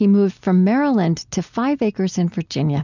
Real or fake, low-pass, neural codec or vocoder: real; 7.2 kHz; none